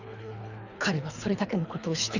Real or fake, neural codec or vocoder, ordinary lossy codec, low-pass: fake; codec, 24 kHz, 3 kbps, HILCodec; none; 7.2 kHz